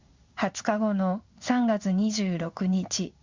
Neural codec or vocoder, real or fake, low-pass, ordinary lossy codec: codec, 16 kHz in and 24 kHz out, 1 kbps, XY-Tokenizer; fake; 7.2 kHz; Opus, 64 kbps